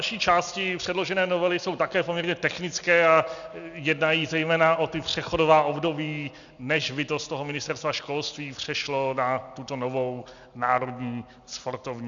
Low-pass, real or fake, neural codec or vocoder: 7.2 kHz; real; none